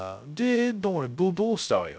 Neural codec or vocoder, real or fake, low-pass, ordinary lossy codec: codec, 16 kHz, 0.2 kbps, FocalCodec; fake; none; none